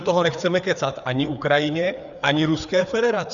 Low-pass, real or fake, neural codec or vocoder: 7.2 kHz; fake; codec, 16 kHz, 4 kbps, FreqCodec, larger model